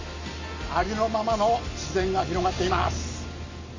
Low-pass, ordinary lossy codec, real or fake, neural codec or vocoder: 7.2 kHz; MP3, 32 kbps; real; none